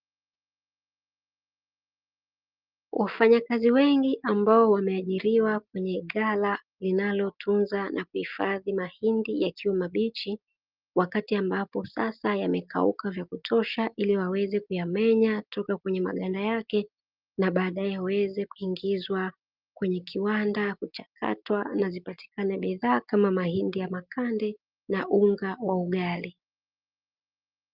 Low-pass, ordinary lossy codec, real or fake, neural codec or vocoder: 5.4 kHz; Opus, 24 kbps; real; none